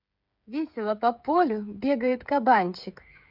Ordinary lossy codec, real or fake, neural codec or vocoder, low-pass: none; fake; codec, 16 kHz, 8 kbps, FreqCodec, smaller model; 5.4 kHz